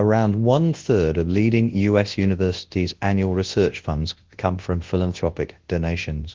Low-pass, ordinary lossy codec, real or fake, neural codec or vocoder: 7.2 kHz; Opus, 16 kbps; fake; codec, 24 kHz, 0.9 kbps, WavTokenizer, large speech release